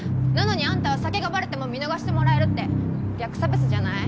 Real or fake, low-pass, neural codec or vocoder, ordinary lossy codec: real; none; none; none